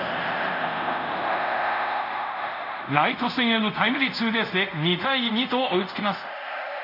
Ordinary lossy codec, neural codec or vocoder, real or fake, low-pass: none; codec, 24 kHz, 0.5 kbps, DualCodec; fake; 5.4 kHz